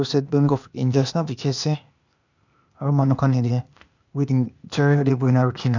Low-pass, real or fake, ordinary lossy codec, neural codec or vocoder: 7.2 kHz; fake; none; codec, 16 kHz, 0.8 kbps, ZipCodec